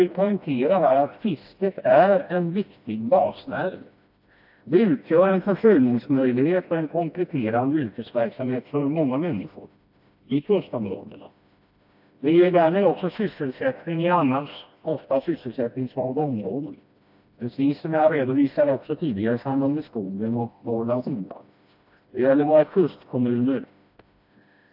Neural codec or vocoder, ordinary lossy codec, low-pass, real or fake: codec, 16 kHz, 1 kbps, FreqCodec, smaller model; AAC, 48 kbps; 5.4 kHz; fake